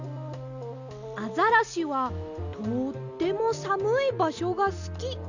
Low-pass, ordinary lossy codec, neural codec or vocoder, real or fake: 7.2 kHz; none; none; real